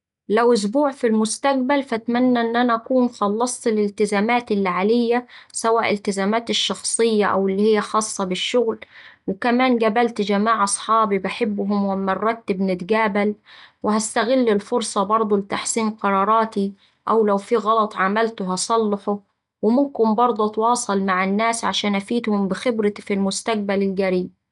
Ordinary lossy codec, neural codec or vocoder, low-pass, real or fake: none; none; 10.8 kHz; real